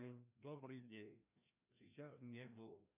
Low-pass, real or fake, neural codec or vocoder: 3.6 kHz; fake; codec, 16 kHz, 1 kbps, FreqCodec, larger model